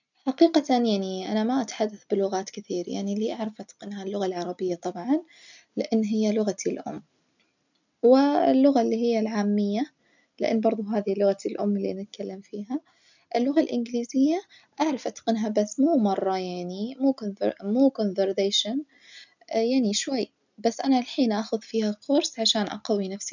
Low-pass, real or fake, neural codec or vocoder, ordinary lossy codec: 7.2 kHz; real; none; none